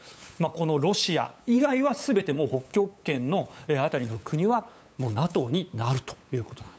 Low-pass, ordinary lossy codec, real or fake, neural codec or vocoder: none; none; fake; codec, 16 kHz, 8 kbps, FunCodec, trained on LibriTTS, 25 frames a second